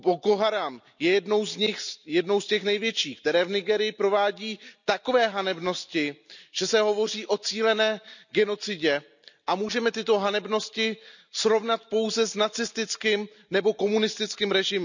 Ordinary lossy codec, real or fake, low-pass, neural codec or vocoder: none; real; 7.2 kHz; none